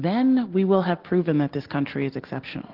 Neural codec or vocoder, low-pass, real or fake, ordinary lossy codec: none; 5.4 kHz; real; Opus, 16 kbps